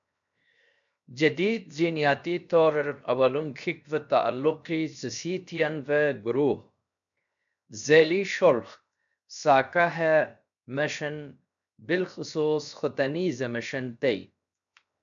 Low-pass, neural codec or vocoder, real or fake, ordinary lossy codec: 7.2 kHz; codec, 16 kHz, 0.7 kbps, FocalCodec; fake; MP3, 96 kbps